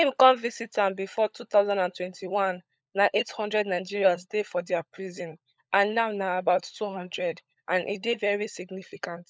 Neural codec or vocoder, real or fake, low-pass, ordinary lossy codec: codec, 16 kHz, 16 kbps, FunCodec, trained on LibriTTS, 50 frames a second; fake; none; none